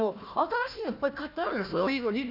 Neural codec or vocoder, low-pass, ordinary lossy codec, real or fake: codec, 16 kHz, 1 kbps, FunCodec, trained on Chinese and English, 50 frames a second; 5.4 kHz; none; fake